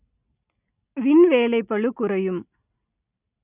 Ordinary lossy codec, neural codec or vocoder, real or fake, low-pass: none; none; real; 3.6 kHz